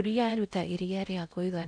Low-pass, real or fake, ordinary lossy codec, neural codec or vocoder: 9.9 kHz; fake; none; codec, 16 kHz in and 24 kHz out, 0.6 kbps, FocalCodec, streaming, 4096 codes